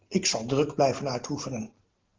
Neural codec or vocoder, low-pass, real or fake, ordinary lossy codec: none; 7.2 kHz; real; Opus, 16 kbps